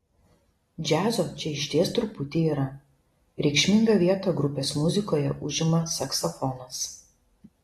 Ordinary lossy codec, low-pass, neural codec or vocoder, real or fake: AAC, 32 kbps; 19.8 kHz; none; real